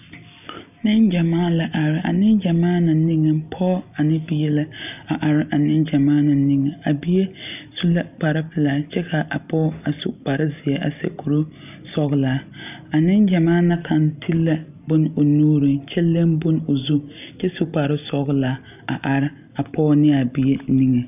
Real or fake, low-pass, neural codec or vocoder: real; 3.6 kHz; none